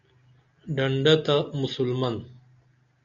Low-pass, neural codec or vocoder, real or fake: 7.2 kHz; none; real